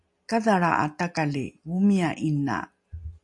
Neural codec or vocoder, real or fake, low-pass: none; real; 10.8 kHz